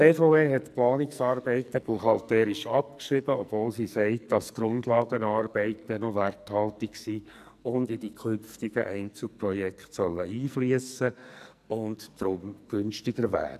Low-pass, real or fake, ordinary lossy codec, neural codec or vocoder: 14.4 kHz; fake; none; codec, 44.1 kHz, 2.6 kbps, SNAC